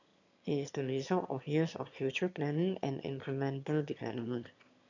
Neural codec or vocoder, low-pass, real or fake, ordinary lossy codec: autoencoder, 22.05 kHz, a latent of 192 numbers a frame, VITS, trained on one speaker; 7.2 kHz; fake; none